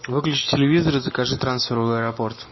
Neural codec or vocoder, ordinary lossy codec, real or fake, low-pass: none; MP3, 24 kbps; real; 7.2 kHz